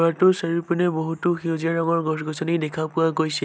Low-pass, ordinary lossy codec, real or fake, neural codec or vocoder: none; none; real; none